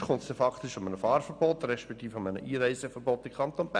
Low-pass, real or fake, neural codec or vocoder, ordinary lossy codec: 9.9 kHz; real; none; none